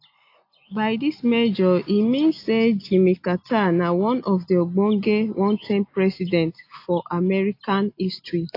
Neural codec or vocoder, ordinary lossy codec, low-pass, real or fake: none; AAC, 32 kbps; 5.4 kHz; real